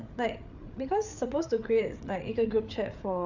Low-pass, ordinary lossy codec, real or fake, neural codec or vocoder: 7.2 kHz; none; fake; codec, 16 kHz, 8 kbps, FreqCodec, larger model